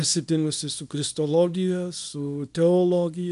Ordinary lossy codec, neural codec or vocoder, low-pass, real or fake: MP3, 96 kbps; codec, 24 kHz, 0.9 kbps, WavTokenizer, small release; 10.8 kHz; fake